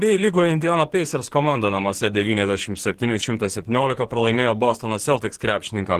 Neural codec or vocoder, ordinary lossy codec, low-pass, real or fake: codec, 44.1 kHz, 2.6 kbps, SNAC; Opus, 16 kbps; 14.4 kHz; fake